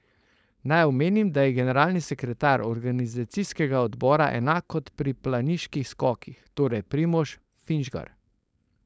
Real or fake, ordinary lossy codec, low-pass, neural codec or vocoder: fake; none; none; codec, 16 kHz, 4.8 kbps, FACodec